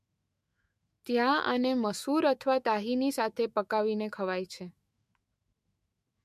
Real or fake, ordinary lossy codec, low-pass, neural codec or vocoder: fake; MP3, 64 kbps; 14.4 kHz; autoencoder, 48 kHz, 128 numbers a frame, DAC-VAE, trained on Japanese speech